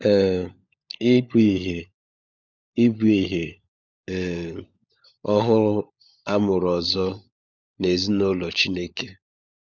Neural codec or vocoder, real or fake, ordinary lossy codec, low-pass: codec, 16 kHz, 16 kbps, FunCodec, trained on LibriTTS, 50 frames a second; fake; none; 7.2 kHz